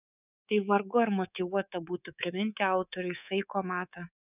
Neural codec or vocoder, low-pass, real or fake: codec, 24 kHz, 3.1 kbps, DualCodec; 3.6 kHz; fake